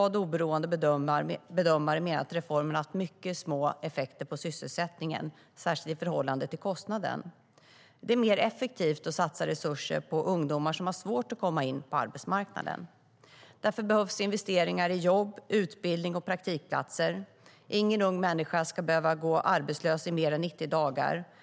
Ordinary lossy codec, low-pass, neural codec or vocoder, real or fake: none; none; none; real